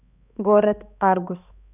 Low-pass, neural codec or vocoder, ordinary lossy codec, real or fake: 3.6 kHz; codec, 16 kHz, 2 kbps, X-Codec, HuBERT features, trained on balanced general audio; none; fake